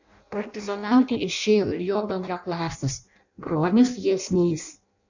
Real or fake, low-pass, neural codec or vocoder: fake; 7.2 kHz; codec, 16 kHz in and 24 kHz out, 0.6 kbps, FireRedTTS-2 codec